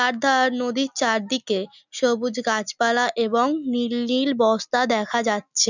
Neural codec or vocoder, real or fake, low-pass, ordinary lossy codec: none; real; 7.2 kHz; none